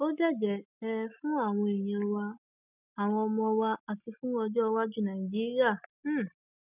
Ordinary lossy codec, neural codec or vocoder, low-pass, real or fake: none; none; 3.6 kHz; real